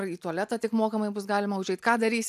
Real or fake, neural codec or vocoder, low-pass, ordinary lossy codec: real; none; 14.4 kHz; AAC, 96 kbps